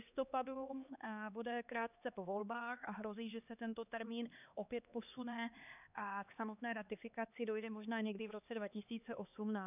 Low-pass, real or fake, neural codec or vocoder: 3.6 kHz; fake; codec, 16 kHz, 2 kbps, X-Codec, HuBERT features, trained on LibriSpeech